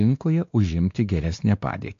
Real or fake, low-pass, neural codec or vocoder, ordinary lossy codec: fake; 7.2 kHz; codec, 16 kHz, 2 kbps, X-Codec, WavLM features, trained on Multilingual LibriSpeech; AAC, 48 kbps